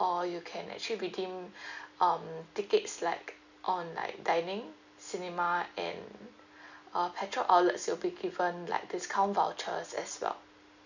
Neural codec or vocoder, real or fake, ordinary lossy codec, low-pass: none; real; none; 7.2 kHz